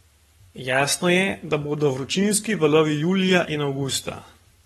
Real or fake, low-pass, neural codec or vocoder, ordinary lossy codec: fake; 19.8 kHz; codec, 44.1 kHz, 7.8 kbps, Pupu-Codec; AAC, 32 kbps